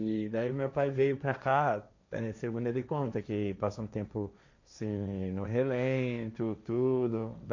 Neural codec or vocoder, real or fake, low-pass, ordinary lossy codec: codec, 16 kHz, 1.1 kbps, Voila-Tokenizer; fake; 7.2 kHz; none